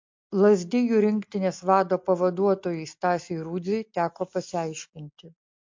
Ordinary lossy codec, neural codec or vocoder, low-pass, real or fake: MP3, 48 kbps; none; 7.2 kHz; real